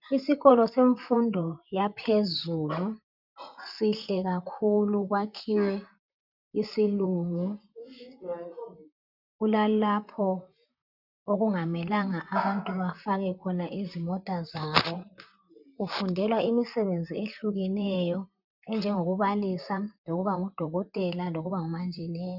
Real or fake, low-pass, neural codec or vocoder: fake; 5.4 kHz; vocoder, 44.1 kHz, 128 mel bands, Pupu-Vocoder